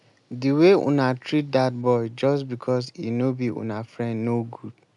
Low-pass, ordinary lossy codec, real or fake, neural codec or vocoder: 10.8 kHz; none; real; none